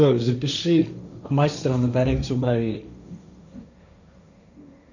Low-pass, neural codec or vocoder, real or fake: 7.2 kHz; codec, 16 kHz, 1.1 kbps, Voila-Tokenizer; fake